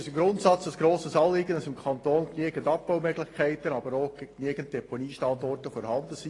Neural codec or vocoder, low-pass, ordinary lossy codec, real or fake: none; 10.8 kHz; AAC, 32 kbps; real